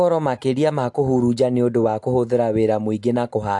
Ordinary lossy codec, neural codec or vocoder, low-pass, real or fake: AAC, 64 kbps; none; 10.8 kHz; real